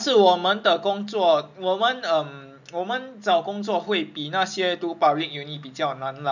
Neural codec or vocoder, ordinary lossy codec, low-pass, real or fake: none; none; 7.2 kHz; real